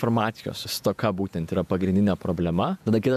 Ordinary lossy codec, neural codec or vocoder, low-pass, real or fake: MP3, 96 kbps; vocoder, 48 kHz, 128 mel bands, Vocos; 14.4 kHz; fake